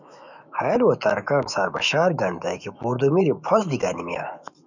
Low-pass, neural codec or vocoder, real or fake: 7.2 kHz; autoencoder, 48 kHz, 128 numbers a frame, DAC-VAE, trained on Japanese speech; fake